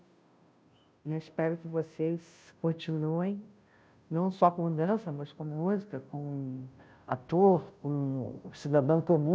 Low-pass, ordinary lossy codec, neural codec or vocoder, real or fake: none; none; codec, 16 kHz, 0.5 kbps, FunCodec, trained on Chinese and English, 25 frames a second; fake